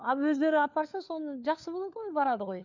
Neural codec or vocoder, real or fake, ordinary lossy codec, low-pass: codec, 16 kHz, 4 kbps, FunCodec, trained on LibriTTS, 50 frames a second; fake; none; 7.2 kHz